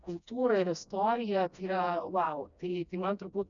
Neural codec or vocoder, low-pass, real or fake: codec, 16 kHz, 1 kbps, FreqCodec, smaller model; 7.2 kHz; fake